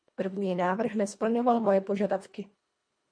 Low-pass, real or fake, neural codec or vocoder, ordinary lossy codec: 9.9 kHz; fake; codec, 24 kHz, 1.5 kbps, HILCodec; MP3, 48 kbps